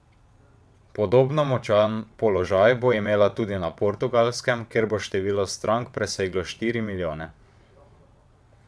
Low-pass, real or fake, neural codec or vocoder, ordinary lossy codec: none; fake; vocoder, 22.05 kHz, 80 mel bands, WaveNeXt; none